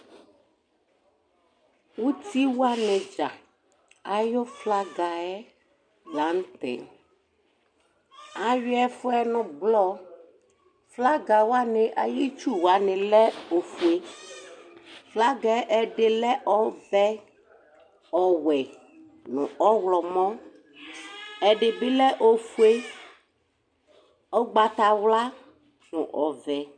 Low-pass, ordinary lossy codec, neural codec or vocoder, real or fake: 9.9 kHz; MP3, 96 kbps; none; real